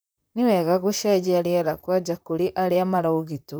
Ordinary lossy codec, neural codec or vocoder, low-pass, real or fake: none; vocoder, 44.1 kHz, 128 mel bands, Pupu-Vocoder; none; fake